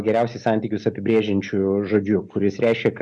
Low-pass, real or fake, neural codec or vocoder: 10.8 kHz; real; none